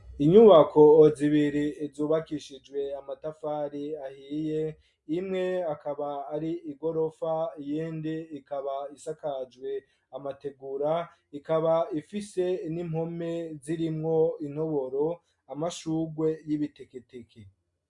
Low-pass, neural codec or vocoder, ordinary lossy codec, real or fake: 10.8 kHz; none; MP3, 64 kbps; real